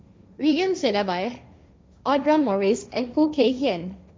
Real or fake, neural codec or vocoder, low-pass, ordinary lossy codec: fake; codec, 16 kHz, 1.1 kbps, Voila-Tokenizer; none; none